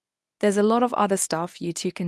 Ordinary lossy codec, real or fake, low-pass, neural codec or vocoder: none; fake; none; codec, 24 kHz, 0.9 kbps, WavTokenizer, medium speech release version 1